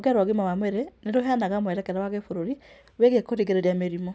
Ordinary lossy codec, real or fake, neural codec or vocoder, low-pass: none; real; none; none